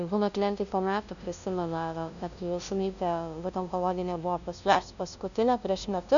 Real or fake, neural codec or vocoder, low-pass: fake; codec, 16 kHz, 0.5 kbps, FunCodec, trained on LibriTTS, 25 frames a second; 7.2 kHz